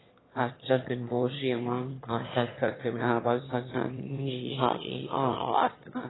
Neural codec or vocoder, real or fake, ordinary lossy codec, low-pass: autoencoder, 22.05 kHz, a latent of 192 numbers a frame, VITS, trained on one speaker; fake; AAC, 16 kbps; 7.2 kHz